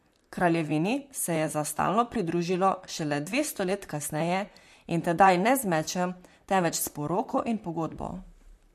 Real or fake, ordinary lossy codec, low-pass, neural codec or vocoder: fake; MP3, 64 kbps; 14.4 kHz; vocoder, 44.1 kHz, 128 mel bands, Pupu-Vocoder